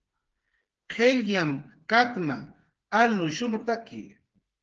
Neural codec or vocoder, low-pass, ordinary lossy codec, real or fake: codec, 16 kHz, 4 kbps, FreqCodec, smaller model; 7.2 kHz; Opus, 16 kbps; fake